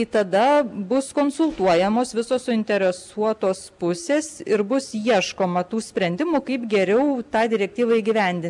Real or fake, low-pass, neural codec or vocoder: real; 10.8 kHz; none